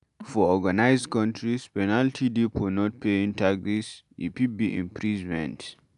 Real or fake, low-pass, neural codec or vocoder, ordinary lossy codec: real; 10.8 kHz; none; AAC, 96 kbps